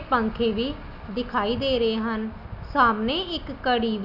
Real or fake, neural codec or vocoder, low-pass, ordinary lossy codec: real; none; 5.4 kHz; none